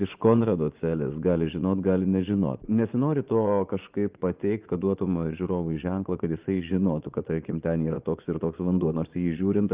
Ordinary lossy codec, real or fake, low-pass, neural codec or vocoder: Opus, 24 kbps; fake; 3.6 kHz; vocoder, 24 kHz, 100 mel bands, Vocos